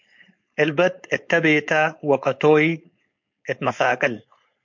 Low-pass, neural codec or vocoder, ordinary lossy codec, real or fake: 7.2 kHz; codec, 16 kHz, 4.8 kbps, FACodec; MP3, 48 kbps; fake